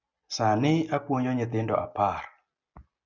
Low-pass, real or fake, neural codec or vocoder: 7.2 kHz; real; none